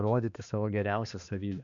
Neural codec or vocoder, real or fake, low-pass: codec, 16 kHz, 2 kbps, X-Codec, HuBERT features, trained on balanced general audio; fake; 7.2 kHz